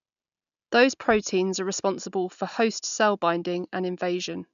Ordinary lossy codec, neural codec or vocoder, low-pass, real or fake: none; none; 7.2 kHz; real